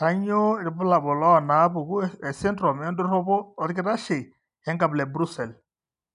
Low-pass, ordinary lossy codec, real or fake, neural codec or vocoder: 10.8 kHz; none; real; none